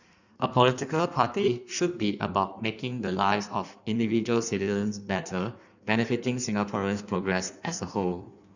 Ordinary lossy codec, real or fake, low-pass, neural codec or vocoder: none; fake; 7.2 kHz; codec, 16 kHz in and 24 kHz out, 1.1 kbps, FireRedTTS-2 codec